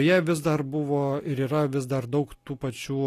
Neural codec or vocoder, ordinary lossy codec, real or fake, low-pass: none; AAC, 48 kbps; real; 14.4 kHz